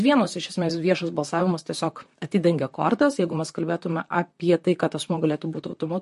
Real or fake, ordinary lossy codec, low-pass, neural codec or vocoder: fake; MP3, 48 kbps; 14.4 kHz; vocoder, 44.1 kHz, 128 mel bands, Pupu-Vocoder